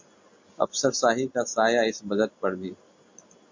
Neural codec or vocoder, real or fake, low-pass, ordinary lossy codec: none; real; 7.2 kHz; MP3, 48 kbps